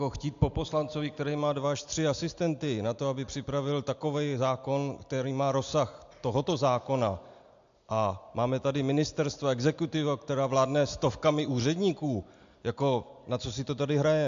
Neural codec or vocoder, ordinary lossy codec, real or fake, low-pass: none; AAC, 64 kbps; real; 7.2 kHz